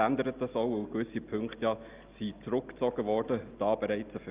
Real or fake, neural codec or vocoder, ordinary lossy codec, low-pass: real; none; Opus, 24 kbps; 3.6 kHz